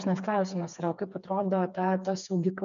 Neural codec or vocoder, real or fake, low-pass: codec, 16 kHz, 4 kbps, FreqCodec, smaller model; fake; 7.2 kHz